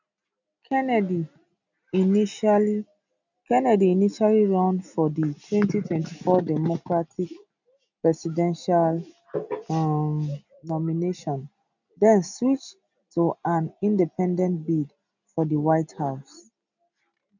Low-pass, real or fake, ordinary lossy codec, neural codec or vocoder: 7.2 kHz; real; none; none